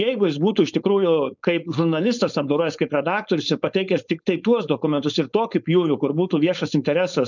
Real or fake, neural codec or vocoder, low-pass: fake; codec, 16 kHz, 4.8 kbps, FACodec; 7.2 kHz